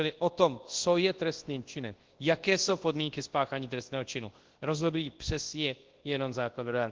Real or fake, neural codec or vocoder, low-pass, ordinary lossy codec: fake; codec, 24 kHz, 0.9 kbps, WavTokenizer, large speech release; 7.2 kHz; Opus, 16 kbps